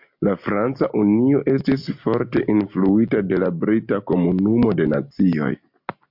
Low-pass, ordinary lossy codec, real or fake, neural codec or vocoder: 5.4 kHz; MP3, 48 kbps; real; none